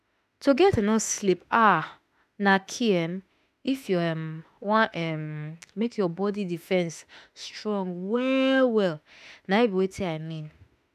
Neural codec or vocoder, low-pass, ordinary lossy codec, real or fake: autoencoder, 48 kHz, 32 numbers a frame, DAC-VAE, trained on Japanese speech; 14.4 kHz; none; fake